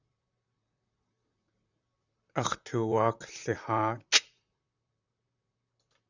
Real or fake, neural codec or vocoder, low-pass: fake; vocoder, 44.1 kHz, 128 mel bands, Pupu-Vocoder; 7.2 kHz